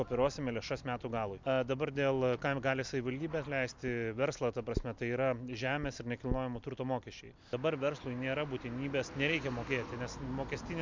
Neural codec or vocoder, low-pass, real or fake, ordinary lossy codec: none; 7.2 kHz; real; MP3, 64 kbps